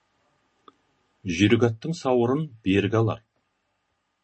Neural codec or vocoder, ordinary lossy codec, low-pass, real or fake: none; MP3, 32 kbps; 9.9 kHz; real